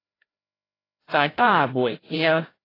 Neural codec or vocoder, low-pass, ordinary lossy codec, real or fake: codec, 16 kHz, 0.5 kbps, FreqCodec, larger model; 5.4 kHz; AAC, 24 kbps; fake